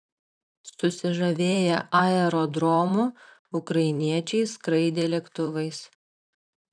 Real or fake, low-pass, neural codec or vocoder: fake; 9.9 kHz; vocoder, 44.1 kHz, 128 mel bands, Pupu-Vocoder